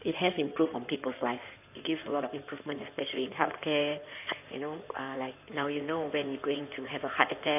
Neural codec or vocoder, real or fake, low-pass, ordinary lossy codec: codec, 16 kHz in and 24 kHz out, 2.2 kbps, FireRedTTS-2 codec; fake; 3.6 kHz; none